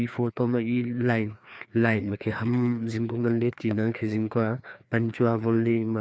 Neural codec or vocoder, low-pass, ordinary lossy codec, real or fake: codec, 16 kHz, 2 kbps, FreqCodec, larger model; none; none; fake